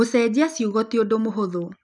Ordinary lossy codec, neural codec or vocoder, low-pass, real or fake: none; none; 10.8 kHz; real